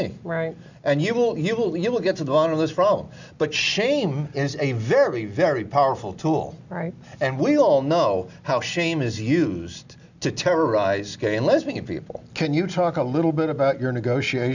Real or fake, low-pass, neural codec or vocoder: real; 7.2 kHz; none